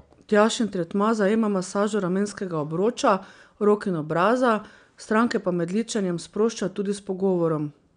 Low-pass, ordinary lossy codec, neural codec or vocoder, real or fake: 9.9 kHz; none; none; real